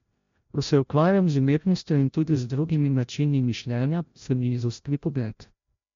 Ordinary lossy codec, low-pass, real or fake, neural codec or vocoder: MP3, 48 kbps; 7.2 kHz; fake; codec, 16 kHz, 0.5 kbps, FreqCodec, larger model